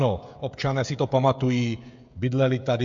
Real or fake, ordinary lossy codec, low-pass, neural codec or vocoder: fake; MP3, 48 kbps; 7.2 kHz; codec, 16 kHz, 16 kbps, FreqCodec, smaller model